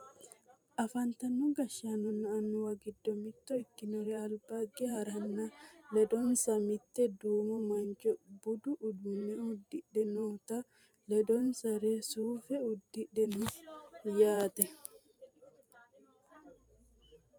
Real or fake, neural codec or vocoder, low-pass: fake; vocoder, 44.1 kHz, 128 mel bands every 512 samples, BigVGAN v2; 19.8 kHz